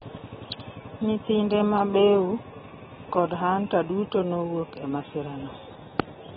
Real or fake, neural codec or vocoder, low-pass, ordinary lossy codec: real; none; 9.9 kHz; AAC, 16 kbps